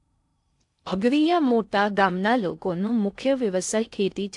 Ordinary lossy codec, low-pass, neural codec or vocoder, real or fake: AAC, 64 kbps; 10.8 kHz; codec, 16 kHz in and 24 kHz out, 0.6 kbps, FocalCodec, streaming, 4096 codes; fake